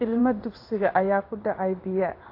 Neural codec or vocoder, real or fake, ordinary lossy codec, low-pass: codec, 16 kHz in and 24 kHz out, 1 kbps, XY-Tokenizer; fake; AAC, 32 kbps; 5.4 kHz